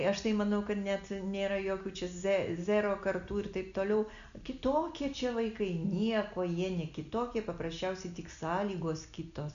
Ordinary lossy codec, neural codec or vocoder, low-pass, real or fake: MP3, 96 kbps; none; 7.2 kHz; real